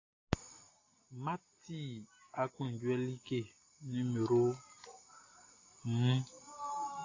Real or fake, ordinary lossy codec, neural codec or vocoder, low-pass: real; AAC, 32 kbps; none; 7.2 kHz